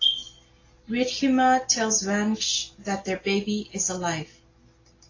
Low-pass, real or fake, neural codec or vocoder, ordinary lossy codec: 7.2 kHz; real; none; AAC, 32 kbps